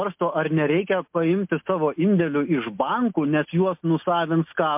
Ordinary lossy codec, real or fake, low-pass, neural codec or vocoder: MP3, 32 kbps; real; 3.6 kHz; none